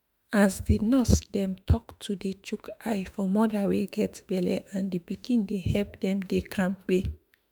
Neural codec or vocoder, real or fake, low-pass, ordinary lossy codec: autoencoder, 48 kHz, 32 numbers a frame, DAC-VAE, trained on Japanese speech; fake; none; none